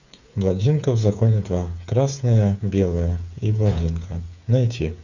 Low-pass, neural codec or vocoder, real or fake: 7.2 kHz; codec, 16 kHz, 8 kbps, FreqCodec, smaller model; fake